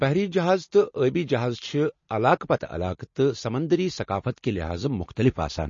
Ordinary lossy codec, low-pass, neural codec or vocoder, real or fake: MP3, 32 kbps; 7.2 kHz; none; real